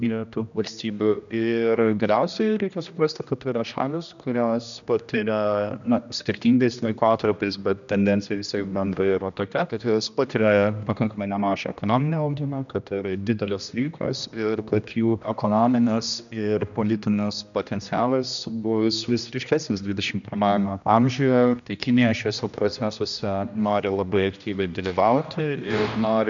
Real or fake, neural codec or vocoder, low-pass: fake; codec, 16 kHz, 1 kbps, X-Codec, HuBERT features, trained on general audio; 7.2 kHz